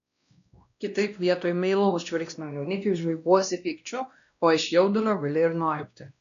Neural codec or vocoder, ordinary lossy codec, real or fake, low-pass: codec, 16 kHz, 1 kbps, X-Codec, WavLM features, trained on Multilingual LibriSpeech; AAC, 96 kbps; fake; 7.2 kHz